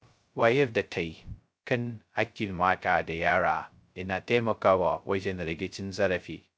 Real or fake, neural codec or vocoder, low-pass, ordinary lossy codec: fake; codec, 16 kHz, 0.2 kbps, FocalCodec; none; none